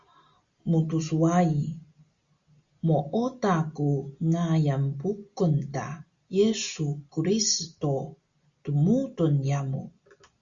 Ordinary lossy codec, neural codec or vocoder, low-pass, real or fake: Opus, 64 kbps; none; 7.2 kHz; real